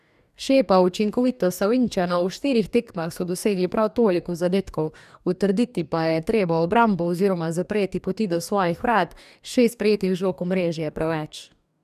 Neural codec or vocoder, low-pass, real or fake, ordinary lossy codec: codec, 44.1 kHz, 2.6 kbps, DAC; 14.4 kHz; fake; none